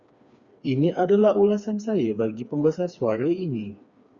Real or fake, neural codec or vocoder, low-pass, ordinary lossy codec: fake; codec, 16 kHz, 4 kbps, FreqCodec, smaller model; 7.2 kHz; Opus, 64 kbps